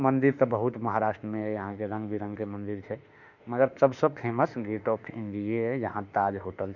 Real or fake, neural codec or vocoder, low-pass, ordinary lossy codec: fake; autoencoder, 48 kHz, 32 numbers a frame, DAC-VAE, trained on Japanese speech; 7.2 kHz; none